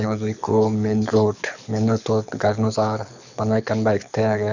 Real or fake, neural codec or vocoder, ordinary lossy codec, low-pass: fake; codec, 24 kHz, 6 kbps, HILCodec; none; 7.2 kHz